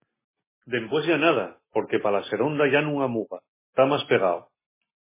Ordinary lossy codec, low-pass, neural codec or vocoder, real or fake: MP3, 16 kbps; 3.6 kHz; none; real